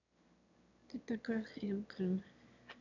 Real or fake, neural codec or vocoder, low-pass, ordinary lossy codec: fake; autoencoder, 22.05 kHz, a latent of 192 numbers a frame, VITS, trained on one speaker; 7.2 kHz; none